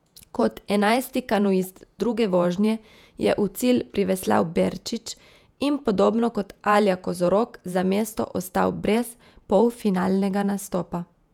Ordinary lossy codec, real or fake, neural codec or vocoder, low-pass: none; fake; vocoder, 48 kHz, 128 mel bands, Vocos; 19.8 kHz